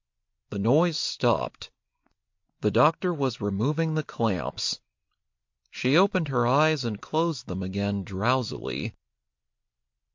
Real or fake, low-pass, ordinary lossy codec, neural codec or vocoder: real; 7.2 kHz; MP3, 48 kbps; none